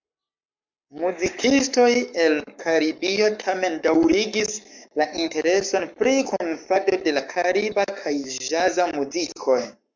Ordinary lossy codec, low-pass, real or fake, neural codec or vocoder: MP3, 64 kbps; 7.2 kHz; fake; codec, 44.1 kHz, 7.8 kbps, Pupu-Codec